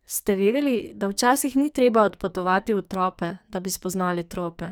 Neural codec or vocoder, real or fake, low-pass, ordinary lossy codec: codec, 44.1 kHz, 2.6 kbps, SNAC; fake; none; none